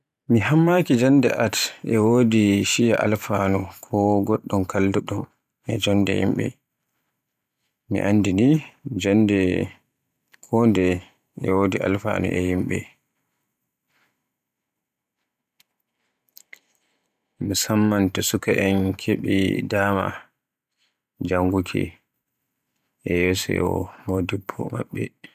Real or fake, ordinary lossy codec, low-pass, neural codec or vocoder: fake; none; 14.4 kHz; vocoder, 44.1 kHz, 128 mel bands every 512 samples, BigVGAN v2